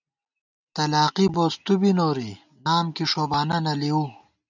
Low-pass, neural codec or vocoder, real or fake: 7.2 kHz; none; real